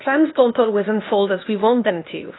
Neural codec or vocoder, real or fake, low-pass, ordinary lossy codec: codec, 16 kHz, 0.8 kbps, ZipCodec; fake; 7.2 kHz; AAC, 16 kbps